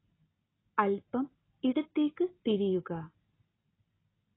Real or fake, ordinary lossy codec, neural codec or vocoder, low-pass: real; AAC, 16 kbps; none; 7.2 kHz